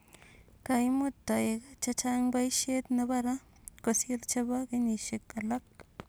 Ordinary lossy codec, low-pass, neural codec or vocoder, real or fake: none; none; none; real